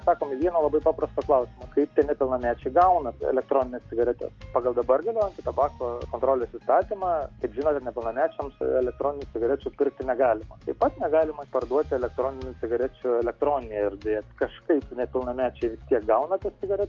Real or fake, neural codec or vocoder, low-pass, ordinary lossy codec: real; none; 7.2 kHz; Opus, 32 kbps